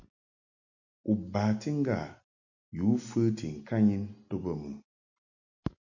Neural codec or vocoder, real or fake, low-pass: none; real; 7.2 kHz